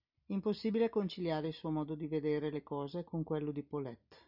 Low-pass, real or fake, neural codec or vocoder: 5.4 kHz; real; none